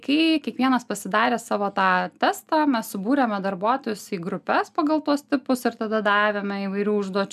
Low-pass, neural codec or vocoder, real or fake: 14.4 kHz; none; real